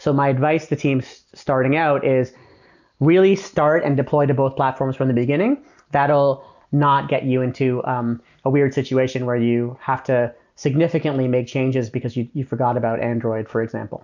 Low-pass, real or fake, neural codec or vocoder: 7.2 kHz; real; none